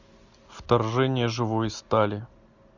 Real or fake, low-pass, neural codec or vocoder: real; 7.2 kHz; none